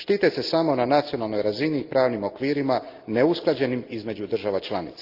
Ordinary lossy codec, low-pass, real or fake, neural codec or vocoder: Opus, 32 kbps; 5.4 kHz; real; none